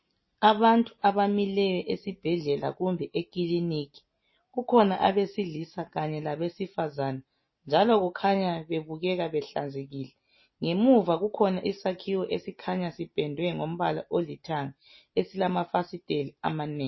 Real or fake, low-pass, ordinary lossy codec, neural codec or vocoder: real; 7.2 kHz; MP3, 24 kbps; none